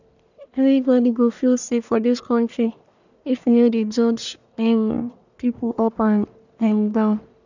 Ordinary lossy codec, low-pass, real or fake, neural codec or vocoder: none; 7.2 kHz; fake; codec, 44.1 kHz, 1.7 kbps, Pupu-Codec